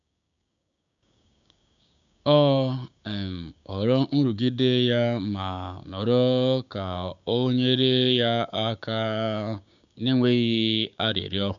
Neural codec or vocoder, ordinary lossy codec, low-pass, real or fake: codec, 16 kHz, 6 kbps, DAC; none; 7.2 kHz; fake